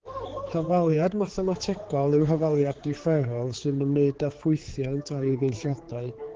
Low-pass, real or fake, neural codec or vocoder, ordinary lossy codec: 7.2 kHz; fake; codec, 16 kHz, 4 kbps, X-Codec, HuBERT features, trained on general audio; Opus, 16 kbps